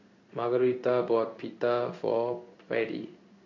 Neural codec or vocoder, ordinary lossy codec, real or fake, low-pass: codec, 16 kHz in and 24 kHz out, 1 kbps, XY-Tokenizer; MP3, 48 kbps; fake; 7.2 kHz